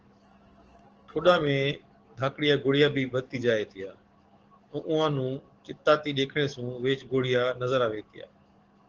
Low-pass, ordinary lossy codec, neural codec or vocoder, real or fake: 7.2 kHz; Opus, 16 kbps; codec, 44.1 kHz, 7.8 kbps, DAC; fake